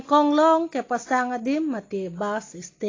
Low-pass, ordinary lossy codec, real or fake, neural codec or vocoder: 7.2 kHz; AAC, 32 kbps; real; none